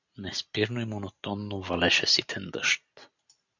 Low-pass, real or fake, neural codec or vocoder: 7.2 kHz; real; none